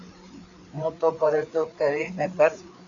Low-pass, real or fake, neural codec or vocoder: 7.2 kHz; fake; codec, 16 kHz, 4 kbps, FreqCodec, larger model